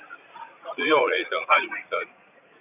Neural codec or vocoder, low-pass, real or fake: vocoder, 44.1 kHz, 80 mel bands, Vocos; 3.6 kHz; fake